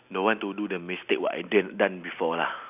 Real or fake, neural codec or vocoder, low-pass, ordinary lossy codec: real; none; 3.6 kHz; none